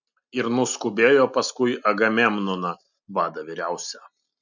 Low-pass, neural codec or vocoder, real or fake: 7.2 kHz; none; real